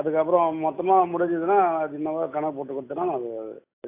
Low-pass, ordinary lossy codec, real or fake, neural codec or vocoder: 3.6 kHz; AAC, 24 kbps; real; none